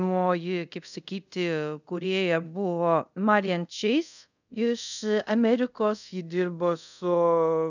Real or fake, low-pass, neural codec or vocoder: fake; 7.2 kHz; codec, 24 kHz, 0.5 kbps, DualCodec